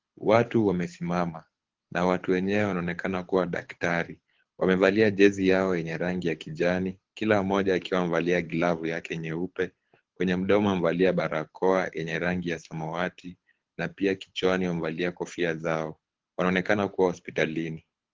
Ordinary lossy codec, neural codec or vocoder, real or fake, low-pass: Opus, 16 kbps; codec, 24 kHz, 6 kbps, HILCodec; fake; 7.2 kHz